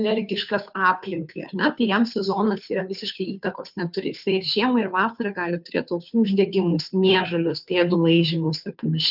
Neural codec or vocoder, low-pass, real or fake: codec, 16 kHz, 2 kbps, FunCodec, trained on Chinese and English, 25 frames a second; 5.4 kHz; fake